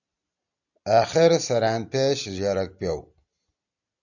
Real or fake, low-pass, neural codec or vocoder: real; 7.2 kHz; none